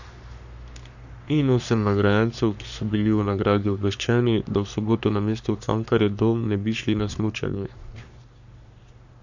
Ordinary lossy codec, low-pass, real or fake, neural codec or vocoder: none; 7.2 kHz; fake; codec, 44.1 kHz, 3.4 kbps, Pupu-Codec